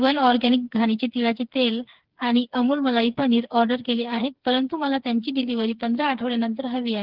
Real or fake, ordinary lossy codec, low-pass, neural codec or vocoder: fake; Opus, 16 kbps; 5.4 kHz; codec, 16 kHz, 4 kbps, FreqCodec, smaller model